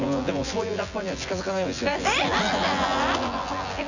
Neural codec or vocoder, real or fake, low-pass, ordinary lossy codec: vocoder, 24 kHz, 100 mel bands, Vocos; fake; 7.2 kHz; none